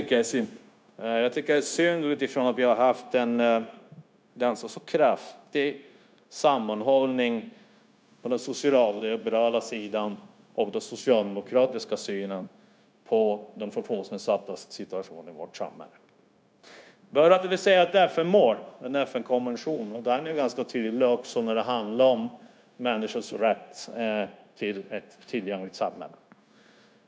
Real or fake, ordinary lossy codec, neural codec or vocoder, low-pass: fake; none; codec, 16 kHz, 0.9 kbps, LongCat-Audio-Codec; none